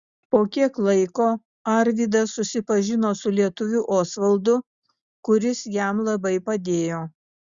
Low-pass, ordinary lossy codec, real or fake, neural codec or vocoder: 7.2 kHz; Opus, 64 kbps; real; none